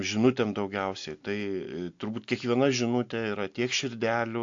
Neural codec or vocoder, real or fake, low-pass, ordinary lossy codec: none; real; 7.2 kHz; AAC, 64 kbps